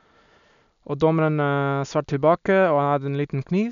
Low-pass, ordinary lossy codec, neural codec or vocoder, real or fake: 7.2 kHz; none; none; real